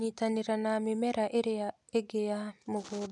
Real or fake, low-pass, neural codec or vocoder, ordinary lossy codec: real; 10.8 kHz; none; none